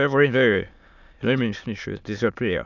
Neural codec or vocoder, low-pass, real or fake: autoencoder, 22.05 kHz, a latent of 192 numbers a frame, VITS, trained on many speakers; 7.2 kHz; fake